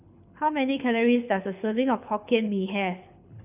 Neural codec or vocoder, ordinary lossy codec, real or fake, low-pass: codec, 24 kHz, 6 kbps, HILCodec; none; fake; 3.6 kHz